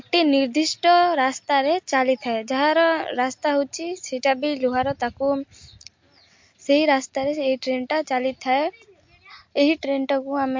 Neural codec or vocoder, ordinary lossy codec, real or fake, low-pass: none; MP3, 48 kbps; real; 7.2 kHz